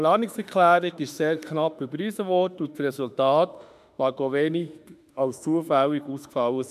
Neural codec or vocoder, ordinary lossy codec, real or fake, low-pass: autoencoder, 48 kHz, 32 numbers a frame, DAC-VAE, trained on Japanese speech; none; fake; 14.4 kHz